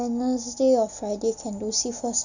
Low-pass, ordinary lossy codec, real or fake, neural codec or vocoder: 7.2 kHz; none; real; none